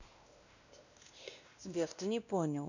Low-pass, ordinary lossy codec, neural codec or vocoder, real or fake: 7.2 kHz; none; codec, 16 kHz, 1 kbps, X-Codec, WavLM features, trained on Multilingual LibriSpeech; fake